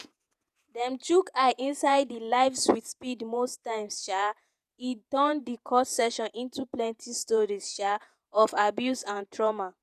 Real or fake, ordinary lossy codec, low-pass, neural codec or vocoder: fake; none; 14.4 kHz; vocoder, 48 kHz, 128 mel bands, Vocos